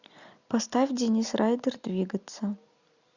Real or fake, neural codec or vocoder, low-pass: real; none; 7.2 kHz